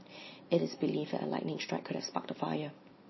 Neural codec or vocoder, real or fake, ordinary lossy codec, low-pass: none; real; MP3, 24 kbps; 7.2 kHz